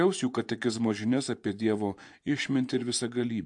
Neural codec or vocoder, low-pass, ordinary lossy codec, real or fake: vocoder, 24 kHz, 100 mel bands, Vocos; 10.8 kHz; AAC, 64 kbps; fake